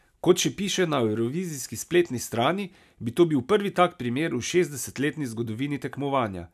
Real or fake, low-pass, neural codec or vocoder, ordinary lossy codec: real; 14.4 kHz; none; none